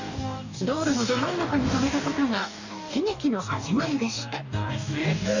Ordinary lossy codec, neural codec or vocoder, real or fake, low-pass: none; codec, 44.1 kHz, 2.6 kbps, DAC; fake; 7.2 kHz